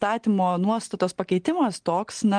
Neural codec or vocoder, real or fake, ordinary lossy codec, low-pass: none; real; Opus, 24 kbps; 9.9 kHz